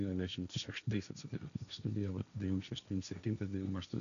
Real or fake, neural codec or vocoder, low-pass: fake; codec, 16 kHz, 1.1 kbps, Voila-Tokenizer; 7.2 kHz